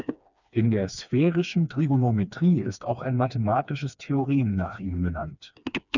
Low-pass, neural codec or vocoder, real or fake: 7.2 kHz; codec, 16 kHz, 2 kbps, FreqCodec, smaller model; fake